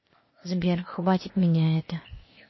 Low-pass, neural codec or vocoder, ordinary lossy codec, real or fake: 7.2 kHz; codec, 16 kHz, 0.8 kbps, ZipCodec; MP3, 24 kbps; fake